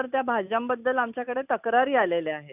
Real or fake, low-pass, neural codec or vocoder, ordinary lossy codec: real; 3.6 kHz; none; none